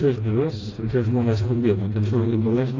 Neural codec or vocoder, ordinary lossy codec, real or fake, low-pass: codec, 16 kHz, 1 kbps, FreqCodec, smaller model; AAC, 32 kbps; fake; 7.2 kHz